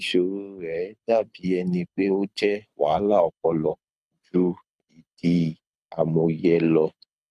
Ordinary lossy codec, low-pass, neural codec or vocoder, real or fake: none; none; codec, 24 kHz, 6 kbps, HILCodec; fake